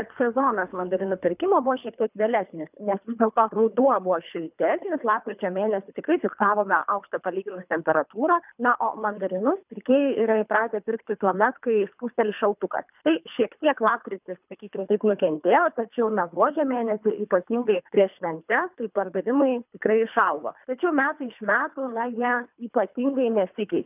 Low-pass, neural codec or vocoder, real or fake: 3.6 kHz; codec, 24 kHz, 3 kbps, HILCodec; fake